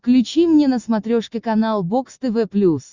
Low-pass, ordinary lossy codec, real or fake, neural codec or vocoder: 7.2 kHz; Opus, 64 kbps; real; none